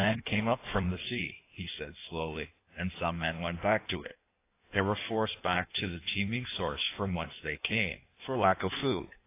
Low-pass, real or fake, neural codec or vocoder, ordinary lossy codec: 3.6 kHz; fake; codec, 16 kHz in and 24 kHz out, 1.1 kbps, FireRedTTS-2 codec; AAC, 24 kbps